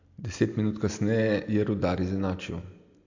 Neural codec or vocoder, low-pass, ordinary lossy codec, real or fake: none; 7.2 kHz; AAC, 48 kbps; real